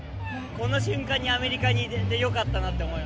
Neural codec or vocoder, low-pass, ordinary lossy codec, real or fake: none; none; none; real